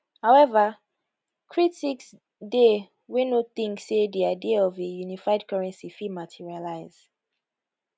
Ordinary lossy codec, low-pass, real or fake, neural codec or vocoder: none; none; real; none